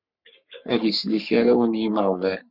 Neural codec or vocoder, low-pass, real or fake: codec, 44.1 kHz, 3.4 kbps, Pupu-Codec; 5.4 kHz; fake